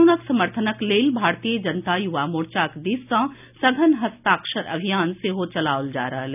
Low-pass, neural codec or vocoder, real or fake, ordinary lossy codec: 3.6 kHz; none; real; none